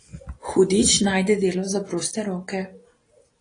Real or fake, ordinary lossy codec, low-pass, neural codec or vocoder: real; AAC, 32 kbps; 9.9 kHz; none